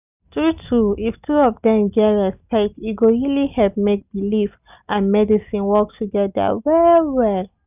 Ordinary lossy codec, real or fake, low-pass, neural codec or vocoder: none; real; 3.6 kHz; none